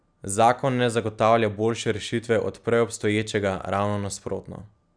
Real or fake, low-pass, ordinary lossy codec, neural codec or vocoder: real; 9.9 kHz; none; none